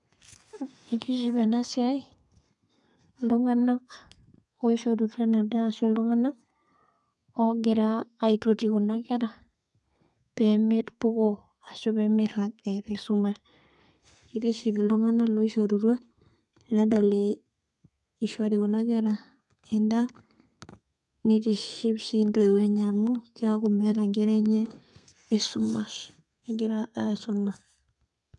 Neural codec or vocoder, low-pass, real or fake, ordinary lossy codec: codec, 32 kHz, 1.9 kbps, SNAC; 10.8 kHz; fake; none